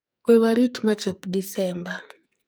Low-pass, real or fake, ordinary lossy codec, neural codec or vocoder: none; fake; none; codec, 44.1 kHz, 2.6 kbps, SNAC